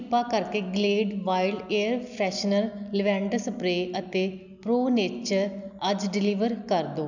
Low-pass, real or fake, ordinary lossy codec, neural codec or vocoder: 7.2 kHz; real; none; none